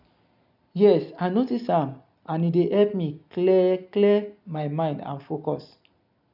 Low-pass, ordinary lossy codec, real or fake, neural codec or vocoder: 5.4 kHz; none; real; none